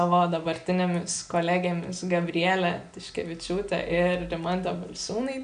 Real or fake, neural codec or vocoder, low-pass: real; none; 9.9 kHz